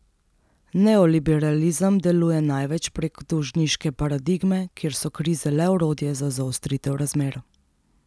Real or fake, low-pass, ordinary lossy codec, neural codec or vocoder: real; none; none; none